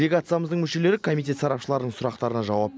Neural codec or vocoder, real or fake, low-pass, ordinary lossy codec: none; real; none; none